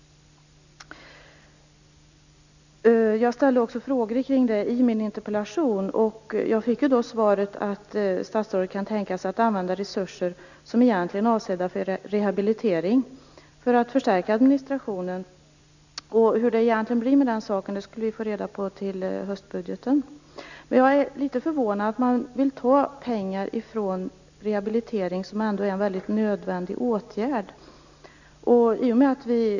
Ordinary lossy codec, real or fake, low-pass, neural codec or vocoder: none; real; 7.2 kHz; none